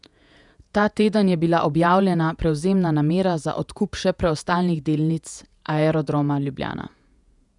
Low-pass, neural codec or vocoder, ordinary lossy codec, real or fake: 10.8 kHz; vocoder, 48 kHz, 128 mel bands, Vocos; none; fake